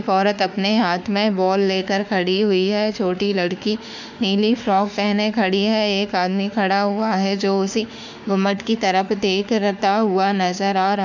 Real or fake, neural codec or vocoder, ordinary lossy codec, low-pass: fake; autoencoder, 48 kHz, 32 numbers a frame, DAC-VAE, trained on Japanese speech; none; 7.2 kHz